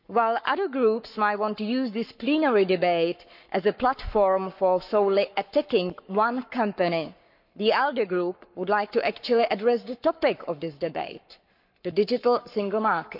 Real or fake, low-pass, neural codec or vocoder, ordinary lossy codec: fake; 5.4 kHz; codec, 44.1 kHz, 7.8 kbps, Pupu-Codec; none